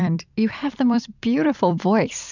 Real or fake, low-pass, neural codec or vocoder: fake; 7.2 kHz; vocoder, 44.1 kHz, 128 mel bands every 256 samples, BigVGAN v2